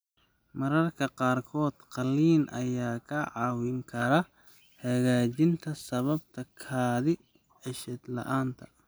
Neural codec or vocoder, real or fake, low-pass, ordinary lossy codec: none; real; none; none